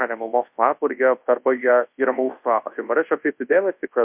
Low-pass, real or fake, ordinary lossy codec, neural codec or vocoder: 3.6 kHz; fake; MP3, 32 kbps; codec, 24 kHz, 0.9 kbps, WavTokenizer, large speech release